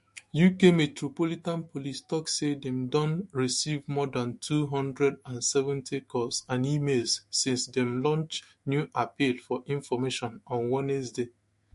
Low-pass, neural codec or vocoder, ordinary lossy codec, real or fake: 14.4 kHz; autoencoder, 48 kHz, 128 numbers a frame, DAC-VAE, trained on Japanese speech; MP3, 48 kbps; fake